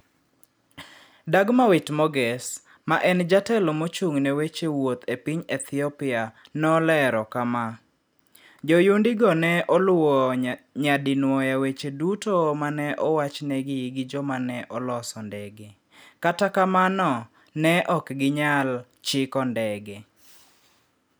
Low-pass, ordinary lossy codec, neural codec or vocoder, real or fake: none; none; none; real